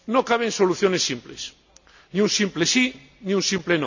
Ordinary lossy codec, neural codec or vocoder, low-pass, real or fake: none; none; 7.2 kHz; real